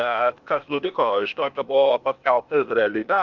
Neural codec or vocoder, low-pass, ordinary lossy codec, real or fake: codec, 16 kHz, 0.8 kbps, ZipCodec; 7.2 kHz; Opus, 64 kbps; fake